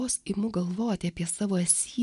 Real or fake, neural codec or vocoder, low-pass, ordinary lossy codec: real; none; 10.8 kHz; MP3, 96 kbps